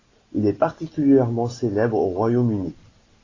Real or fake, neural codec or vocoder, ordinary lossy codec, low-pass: real; none; AAC, 32 kbps; 7.2 kHz